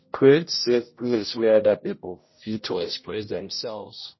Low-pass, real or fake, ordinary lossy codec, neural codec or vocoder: 7.2 kHz; fake; MP3, 24 kbps; codec, 16 kHz, 0.5 kbps, X-Codec, HuBERT features, trained on general audio